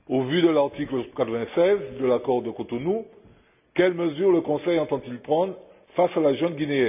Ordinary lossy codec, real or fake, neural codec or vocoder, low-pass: none; real; none; 3.6 kHz